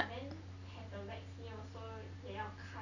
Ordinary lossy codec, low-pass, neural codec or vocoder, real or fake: none; 7.2 kHz; none; real